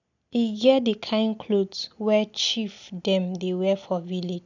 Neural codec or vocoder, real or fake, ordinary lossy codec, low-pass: none; real; none; 7.2 kHz